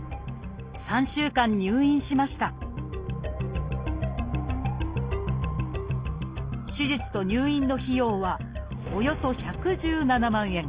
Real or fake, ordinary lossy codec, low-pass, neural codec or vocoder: real; Opus, 24 kbps; 3.6 kHz; none